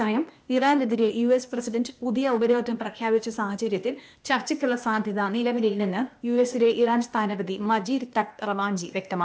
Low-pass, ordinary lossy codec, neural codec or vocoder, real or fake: none; none; codec, 16 kHz, 0.8 kbps, ZipCodec; fake